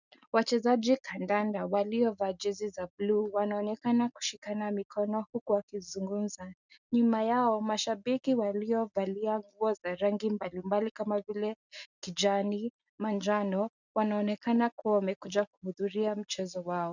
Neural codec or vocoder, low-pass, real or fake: none; 7.2 kHz; real